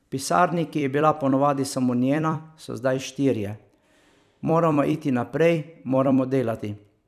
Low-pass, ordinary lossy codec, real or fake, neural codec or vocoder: 14.4 kHz; none; fake; vocoder, 44.1 kHz, 128 mel bands every 256 samples, BigVGAN v2